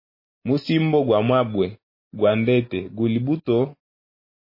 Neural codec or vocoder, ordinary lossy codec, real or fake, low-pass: none; MP3, 24 kbps; real; 5.4 kHz